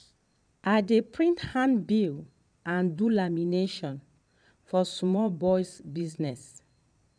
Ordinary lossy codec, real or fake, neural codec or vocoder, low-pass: none; fake; vocoder, 22.05 kHz, 80 mel bands, Vocos; 9.9 kHz